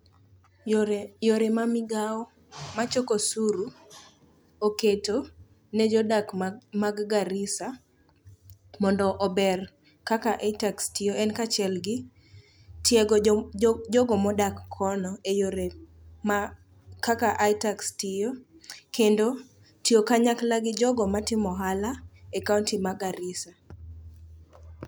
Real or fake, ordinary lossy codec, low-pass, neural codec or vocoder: real; none; none; none